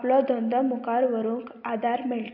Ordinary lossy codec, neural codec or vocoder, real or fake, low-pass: none; none; real; 5.4 kHz